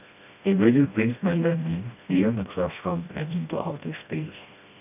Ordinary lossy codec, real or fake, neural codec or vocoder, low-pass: none; fake; codec, 16 kHz, 1 kbps, FreqCodec, smaller model; 3.6 kHz